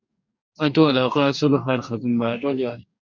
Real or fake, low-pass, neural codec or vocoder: fake; 7.2 kHz; codec, 44.1 kHz, 2.6 kbps, DAC